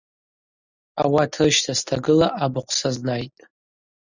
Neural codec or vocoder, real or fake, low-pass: none; real; 7.2 kHz